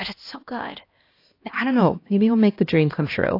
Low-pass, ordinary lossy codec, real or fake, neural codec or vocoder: 5.4 kHz; AAC, 32 kbps; fake; codec, 16 kHz, 1 kbps, X-Codec, HuBERT features, trained on LibriSpeech